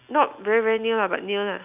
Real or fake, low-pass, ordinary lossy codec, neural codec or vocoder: real; 3.6 kHz; none; none